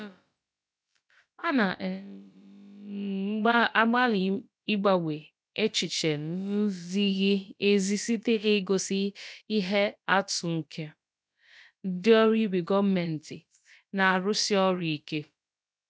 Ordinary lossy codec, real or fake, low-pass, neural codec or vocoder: none; fake; none; codec, 16 kHz, about 1 kbps, DyCAST, with the encoder's durations